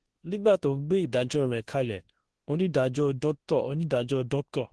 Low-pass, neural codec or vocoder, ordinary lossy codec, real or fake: 10.8 kHz; codec, 24 kHz, 0.9 kbps, WavTokenizer, large speech release; Opus, 16 kbps; fake